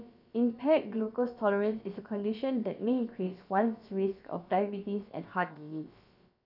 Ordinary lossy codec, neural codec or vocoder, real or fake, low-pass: none; codec, 16 kHz, about 1 kbps, DyCAST, with the encoder's durations; fake; 5.4 kHz